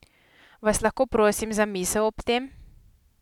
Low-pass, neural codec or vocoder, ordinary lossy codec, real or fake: 19.8 kHz; none; none; real